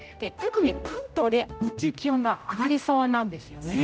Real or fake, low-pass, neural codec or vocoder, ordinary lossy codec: fake; none; codec, 16 kHz, 0.5 kbps, X-Codec, HuBERT features, trained on general audio; none